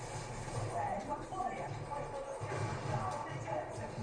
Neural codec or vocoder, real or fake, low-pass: none; real; 9.9 kHz